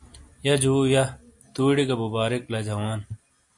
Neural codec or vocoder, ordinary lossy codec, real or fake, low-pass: none; AAC, 64 kbps; real; 10.8 kHz